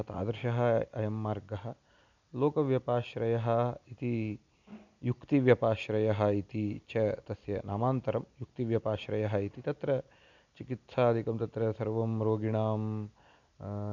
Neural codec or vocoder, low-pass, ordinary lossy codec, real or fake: none; 7.2 kHz; none; real